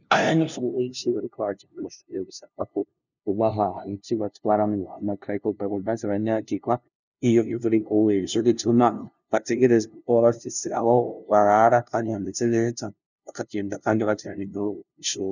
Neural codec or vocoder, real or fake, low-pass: codec, 16 kHz, 0.5 kbps, FunCodec, trained on LibriTTS, 25 frames a second; fake; 7.2 kHz